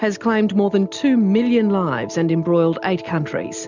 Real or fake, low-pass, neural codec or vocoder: real; 7.2 kHz; none